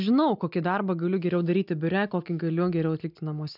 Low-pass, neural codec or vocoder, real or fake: 5.4 kHz; none; real